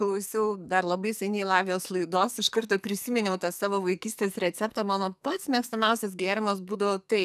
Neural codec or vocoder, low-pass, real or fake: codec, 44.1 kHz, 2.6 kbps, SNAC; 14.4 kHz; fake